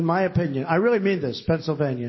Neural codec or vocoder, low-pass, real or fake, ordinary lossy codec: none; 7.2 kHz; real; MP3, 24 kbps